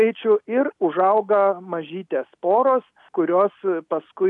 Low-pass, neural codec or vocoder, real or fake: 10.8 kHz; none; real